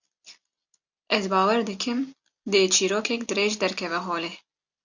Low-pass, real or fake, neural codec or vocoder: 7.2 kHz; real; none